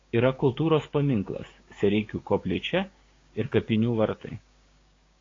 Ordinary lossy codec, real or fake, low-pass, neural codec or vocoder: AAC, 32 kbps; fake; 7.2 kHz; codec, 16 kHz, 6 kbps, DAC